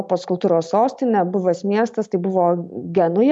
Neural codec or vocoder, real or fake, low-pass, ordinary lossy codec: none; real; 10.8 kHz; MP3, 64 kbps